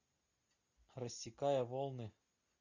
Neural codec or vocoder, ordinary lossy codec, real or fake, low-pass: none; Opus, 64 kbps; real; 7.2 kHz